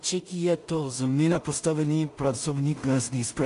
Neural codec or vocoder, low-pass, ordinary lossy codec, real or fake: codec, 16 kHz in and 24 kHz out, 0.4 kbps, LongCat-Audio-Codec, two codebook decoder; 10.8 kHz; AAC, 64 kbps; fake